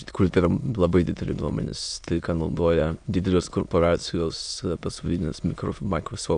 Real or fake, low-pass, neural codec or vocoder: fake; 9.9 kHz; autoencoder, 22.05 kHz, a latent of 192 numbers a frame, VITS, trained on many speakers